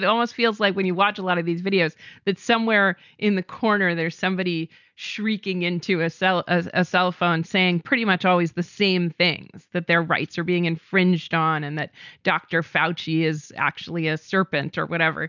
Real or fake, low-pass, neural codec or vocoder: real; 7.2 kHz; none